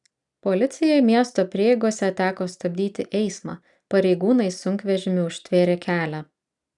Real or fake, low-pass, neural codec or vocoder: real; 10.8 kHz; none